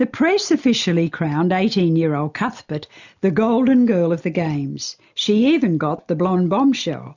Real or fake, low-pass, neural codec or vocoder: real; 7.2 kHz; none